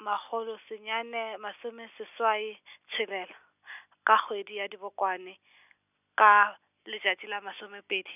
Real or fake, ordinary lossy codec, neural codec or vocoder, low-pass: real; none; none; 3.6 kHz